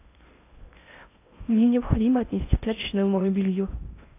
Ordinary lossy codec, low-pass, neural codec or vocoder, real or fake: AAC, 24 kbps; 3.6 kHz; codec, 16 kHz in and 24 kHz out, 0.6 kbps, FocalCodec, streaming, 4096 codes; fake